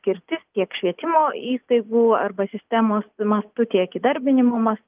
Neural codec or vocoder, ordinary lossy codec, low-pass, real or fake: none; Opus, 24 kbps; 3.6 kHz; real